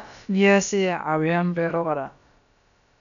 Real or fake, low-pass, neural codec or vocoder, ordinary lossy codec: fake; 7.2 kHz; codec, 16 kHz, about 1 kbps, DyCAST, with the encoder's durations; none